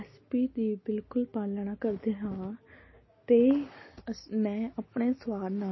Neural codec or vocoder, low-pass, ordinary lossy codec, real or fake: none; 7.2 kHz; MP3, 24 kbps; real